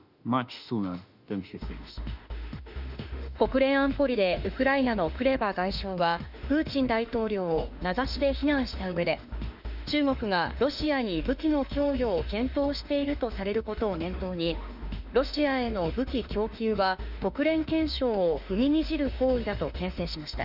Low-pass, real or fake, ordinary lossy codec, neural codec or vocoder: 5.4 kHz; fake; none; autoencoder, 48 kHz, 32 numbers a frame, DAC-VAE, trained on Japanese speech